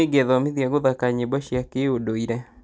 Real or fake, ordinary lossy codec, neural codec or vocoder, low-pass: real; none; none; none